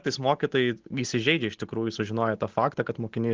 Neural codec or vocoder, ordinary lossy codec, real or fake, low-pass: none; Opus, 16 kbps; real; 7.2 kHz